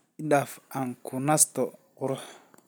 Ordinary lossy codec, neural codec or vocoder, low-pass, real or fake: none; none; none; real